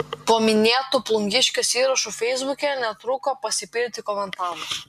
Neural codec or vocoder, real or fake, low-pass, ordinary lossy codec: none; real; 14.4 kHz; MP3, 96 kbps